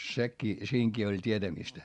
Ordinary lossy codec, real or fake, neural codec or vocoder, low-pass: Opus, 64 kbps; real; none; 10.8 kHz